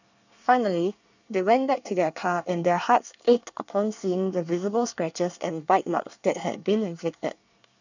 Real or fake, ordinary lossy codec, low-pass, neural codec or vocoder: fake; none; 7.2 kHz; codec, 24 kHz, 1 kbps, SNAC